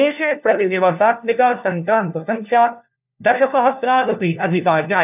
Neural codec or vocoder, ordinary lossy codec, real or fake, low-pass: codec, 16 kHz, 1 kbps, FunCodec, trained on LibriTTS, 50 frames a second; none; fake; 3.6 kHz